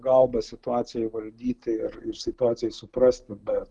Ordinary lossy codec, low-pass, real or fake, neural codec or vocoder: Opus, 24 kbps; 10.8 kHz; real; none